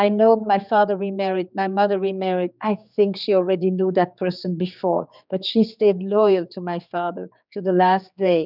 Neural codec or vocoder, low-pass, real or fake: codec, 16 kHz, 4 kbps, X-Codec, HuBERT features, trained on general audio; 5.4 kHz; fake